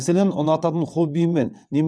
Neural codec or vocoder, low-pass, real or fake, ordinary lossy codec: vocoder, 22.05 kHz, 80 mel bands, WaveNeXt; none; fake; none